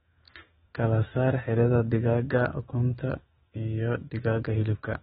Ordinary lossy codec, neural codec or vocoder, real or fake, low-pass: AAC, 16 kbps; autoencoder, 48 kHz, 128 numbers a frame, DAC-VAE, trained on Japanese speech; fake; 19.8 kHz